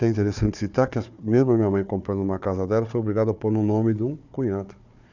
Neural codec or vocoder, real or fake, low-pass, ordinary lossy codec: codec, 16 kHz, 4 kbps, FunCodec, trained on Chinese and English, 50 frames a second; fake; 7.2 kHz; none